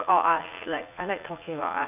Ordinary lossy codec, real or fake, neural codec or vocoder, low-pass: none; fake; vocoder, 44.1 kHz, 80 mel bands, Vocos; 3.6 kHz